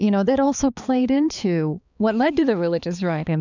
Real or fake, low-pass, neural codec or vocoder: fake; 7.2 kHz; codec, 16 kHz, 4 kbps, X-Codec, HuBERT features, trained on balanced general audio